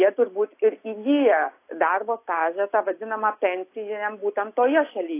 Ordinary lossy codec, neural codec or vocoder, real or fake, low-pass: AAC, 24 kbps; none; real; 3.6 kHz